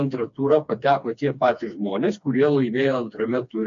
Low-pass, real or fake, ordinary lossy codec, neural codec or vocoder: 7.2 kHz; fake; MP3, 48 kbps; codec, 16 kHz, 2 kbps, FreqCodec, smaller model